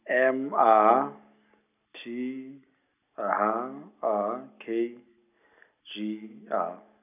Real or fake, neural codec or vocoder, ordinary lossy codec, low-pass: real; none; none; 3.6 kHz